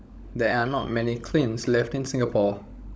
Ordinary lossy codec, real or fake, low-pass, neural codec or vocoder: none; fake; none; codec, 16 kHz, 16 kbps, FunCodec, trained on LibriTTS, 50 frames a second